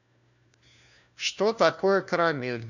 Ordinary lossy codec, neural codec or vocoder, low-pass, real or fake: none; codec, 16 kHz, 1 kbps, FunCodec, trained on LibriTTS, 50 frames a second; 7.2 kHz; fake